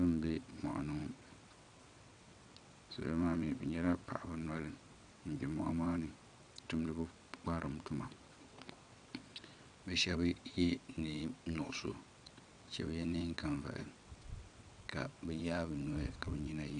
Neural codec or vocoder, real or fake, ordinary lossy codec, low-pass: vocoder, 22.05 kHz, 80 mel bands, WaveNeXt; fake; MP3, 96 kbps; 9.9 kHz